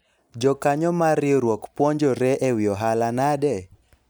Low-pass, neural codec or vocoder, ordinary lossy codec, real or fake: none; none; none; real